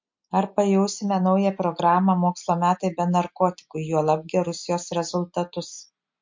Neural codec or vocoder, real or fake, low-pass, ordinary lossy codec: none; real; 7.2 kHz; MP3, 48 kbps